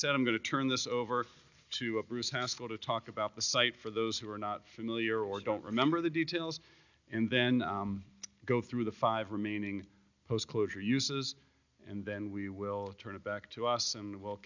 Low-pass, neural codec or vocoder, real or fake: 7.2 kHz; none; real